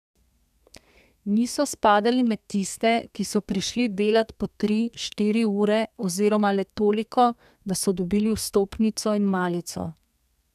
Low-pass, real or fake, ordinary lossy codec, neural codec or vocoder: 14.4 kHz; fake; none; codec, 32 kHz, 1.9 kbps, SNAC